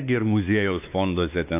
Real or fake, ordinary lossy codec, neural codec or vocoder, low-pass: fake; AAC, 32 kbps; codec, 16 kHz, 4 kbps, X-Codec, WavLM features, trained on Multilingual LibriSpeech; 3.6 kHz